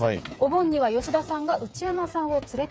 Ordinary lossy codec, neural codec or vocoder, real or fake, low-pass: none; codec, 16 kHz, 8 kbps, FreqCodec, smaller model; fake; none